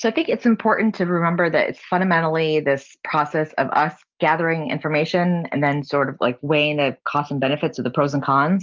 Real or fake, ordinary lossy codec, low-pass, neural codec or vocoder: real; Opus, 24 kbps; 7.2 kHz; none